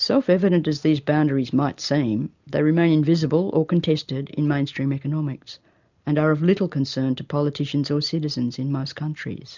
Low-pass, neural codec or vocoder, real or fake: 7.2 kHz; none; real